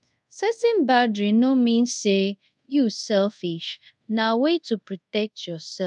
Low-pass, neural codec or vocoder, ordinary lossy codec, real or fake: 10.8 kHz; codec, 24 kHz, 0.5 kbps, DualCodec; MP3, 96 kbps; fake